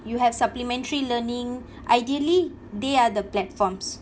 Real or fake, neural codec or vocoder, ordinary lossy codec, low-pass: real; none; none; none